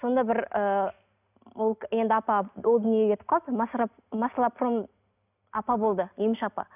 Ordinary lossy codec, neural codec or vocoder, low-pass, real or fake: none; none; 3.6 kHz; real